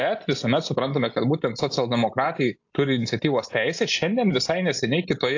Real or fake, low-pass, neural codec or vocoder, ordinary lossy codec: real; 7.2 kHz; none; AAC, 48 kbps